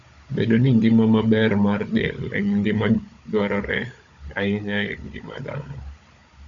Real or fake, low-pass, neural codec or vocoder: fake; 7.2 kHz; codec, 16 kHz, 16 kbps, FunCodec, trained on Chinese and English, 50 frames a second